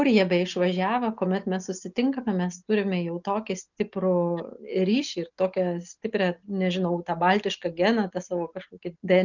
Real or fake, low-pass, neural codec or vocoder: real; 7.2 kHz; none